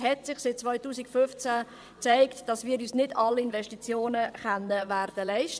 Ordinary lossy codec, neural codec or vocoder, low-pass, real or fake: none; vocoder, 22.05 kHz, 80 mel bands, WaveNeXt; none; fake